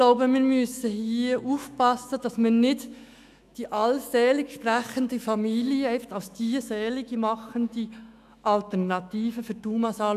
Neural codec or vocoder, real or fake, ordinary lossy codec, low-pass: autoencoder, 48 kHz, 128 numbers a frame, DAC-VAE, trained on Japanese speech; fake; none; 14.4 kHz